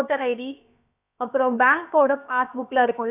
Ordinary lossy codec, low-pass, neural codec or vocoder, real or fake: none; 3.6 kHz; codec, 16 kHz, about 1 kbps, DyCAST, with the encoder's durations; fake